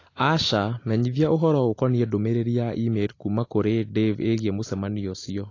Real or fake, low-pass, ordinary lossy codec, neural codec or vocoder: real; 7.2 kHz; AAC, 32 kbps; none